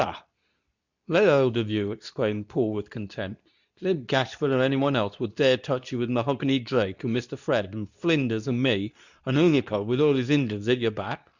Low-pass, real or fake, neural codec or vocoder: 7.2 kHz; fake; codec, 24 kHz, 0.9 kbps, WavTokenizer, medium speech release version 2